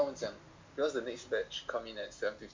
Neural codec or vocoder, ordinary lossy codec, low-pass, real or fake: none; MP3, 48 kbps; 7.2 kHz; real